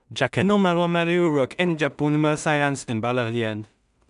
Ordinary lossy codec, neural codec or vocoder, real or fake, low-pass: none; codec, 16 kHz in and 24 kHz out, 0.4 kbps, LongCat-Audio-Codec, two codebook decoder; fake; 10.8 kHz